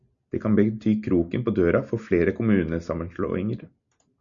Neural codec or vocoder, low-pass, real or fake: none; 7.2 kHz; real